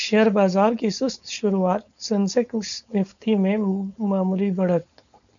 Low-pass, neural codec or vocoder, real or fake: 7.2 kHz; codec, 16 kHz, 4.8 kbps, FACodec; fake